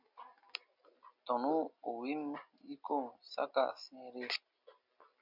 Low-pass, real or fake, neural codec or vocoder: 5.4 kHz; real; none